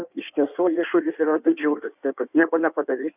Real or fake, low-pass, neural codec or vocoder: fake; 3.6 kHz; codec, 16 kHz in and 24 kHz out, 1.1 kbps, FireRedTTS-2 codec